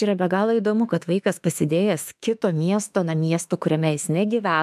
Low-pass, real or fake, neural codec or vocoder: 14.4 kHz; fake; autoencoder, 48 kHz, 32 numbers a frame, DAC-VAE, trained on Japanese speech